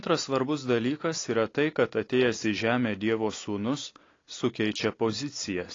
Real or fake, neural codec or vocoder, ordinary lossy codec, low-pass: real; none; AAC, 32 kbps; 7.2 kHz